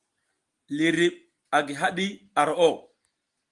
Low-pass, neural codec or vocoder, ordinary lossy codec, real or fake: 10.8 kHz; none; Opus, 24 kbps; real